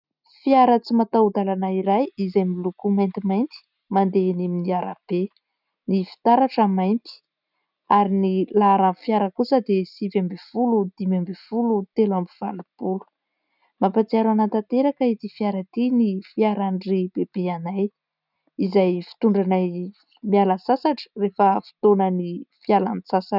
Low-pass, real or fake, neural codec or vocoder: 5.4 kHz; real; none